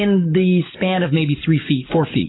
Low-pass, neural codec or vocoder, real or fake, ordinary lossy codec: 7.2 kHz; codec, 44.1 kHz, 7.8 kbps, DAC; fake; AAC, 16 kbps